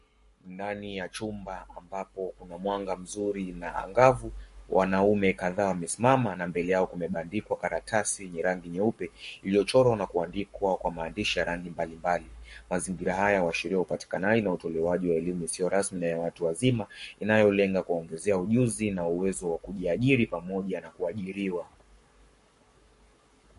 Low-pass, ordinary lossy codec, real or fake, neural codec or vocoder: 14.4 kHz; MP3, 48 kbps; fake; codec, 44.1 kHz, 7.8 kbps, Pupu-Codec